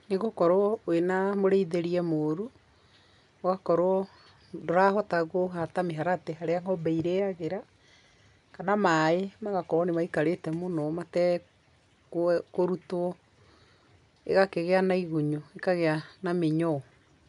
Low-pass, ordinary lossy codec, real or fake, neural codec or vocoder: 10.8 kHz; none; real; none